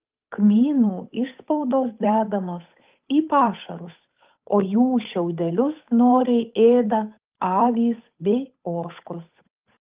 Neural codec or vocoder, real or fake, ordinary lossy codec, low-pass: codec, 16 kHz, 8 kbps, FunCodec, trained on Chinese and English, 25 frames a second; fake; Opus, 24 kbps; 3.6 kHz